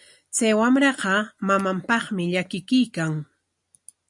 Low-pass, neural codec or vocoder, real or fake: 10.8 kHz; none; real